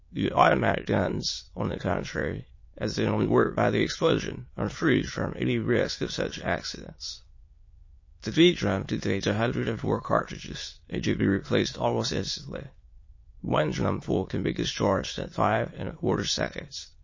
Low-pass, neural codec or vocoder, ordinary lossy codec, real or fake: 7.2 kHz; autoencoder, 22.05 kHz, a latent of 192 numbers a frame, VITS, trained on many speakers; MP3, 32 kbps; fake